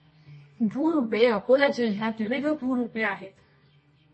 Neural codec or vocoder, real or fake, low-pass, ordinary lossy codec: codec, 24 kHz, 0.9 kbps, WavTokenizer, medium music audio release; fake; 10.8 kHz; MP3, 32 kbps